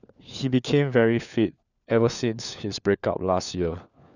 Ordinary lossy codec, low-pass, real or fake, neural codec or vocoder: none; 7.2 kHz; fake; codec, 16 kHz, 4 kbps, FunCodec, trained on LibriTTS, 50 frames a second